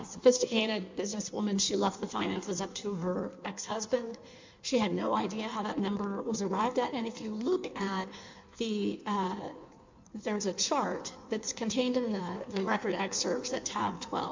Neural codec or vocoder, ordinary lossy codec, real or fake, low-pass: codec, 16 kHz in and 24 kHz out, 1.1 kbps, FireRedTTS-2 codec; MP3, 64 kbps; fake; 7.2 kHz